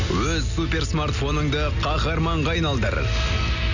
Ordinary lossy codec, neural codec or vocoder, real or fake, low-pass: none; none; real; 7.2 kHz